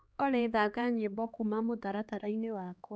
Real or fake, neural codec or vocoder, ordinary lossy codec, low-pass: fake; codec, 16 kHz, 2 kbps, X-Codec, HuBERT features, trained on LibriSpeech; none; none